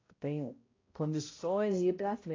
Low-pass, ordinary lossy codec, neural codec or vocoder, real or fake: 7.2 kHz; AAC, 32 kbps; codec, 16 kHz, 0.5 kbps, X-Codec, HuBERT features, trained on balanced general audio; fake